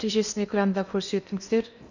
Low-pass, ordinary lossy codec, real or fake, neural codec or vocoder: 7.2 kHz; none; fake; codec, 16 kHz in and 24 kHz out, 0.8 kbps, FocalCodec, streaming, 65536 codes